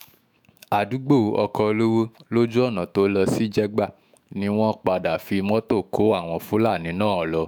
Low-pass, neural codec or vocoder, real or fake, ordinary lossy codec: none; autoencoder, 48 kHz, 128 numbers a frame, DAC-VAE, trained on Japanese speech; fake; none